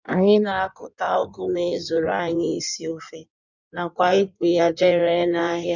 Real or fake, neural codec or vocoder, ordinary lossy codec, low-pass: fake; codec, 16 kHz in and 24 kHz out, 1.1 kbps, FireRedTTS-2 codec; none; 7.2 kHz